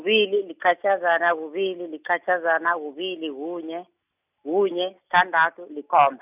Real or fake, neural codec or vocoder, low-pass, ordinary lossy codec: real; none; 3.6 kHz; none